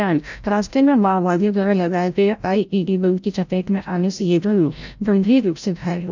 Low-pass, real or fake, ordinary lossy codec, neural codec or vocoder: 7.2 kHz; fake; none; codec, 16 kHz, 0.5 kbps, FreqCodec, larger model